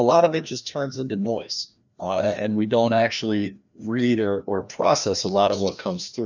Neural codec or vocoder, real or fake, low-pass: codec, 16 kHz, 1 kbps, FreqCodec, larger model; fake; 7.2 kHz